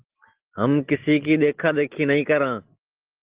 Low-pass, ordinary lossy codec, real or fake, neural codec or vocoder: 3.6 kHz; Opus, 16 kbps; real; none